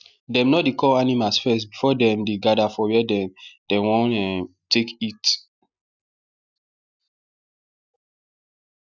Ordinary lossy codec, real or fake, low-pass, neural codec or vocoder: none; real; 7.2 kHz; none